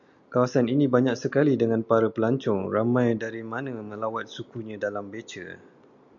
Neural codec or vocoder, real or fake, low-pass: none; real; 7.2 kHz